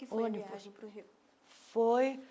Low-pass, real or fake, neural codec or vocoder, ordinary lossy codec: none; real; none; none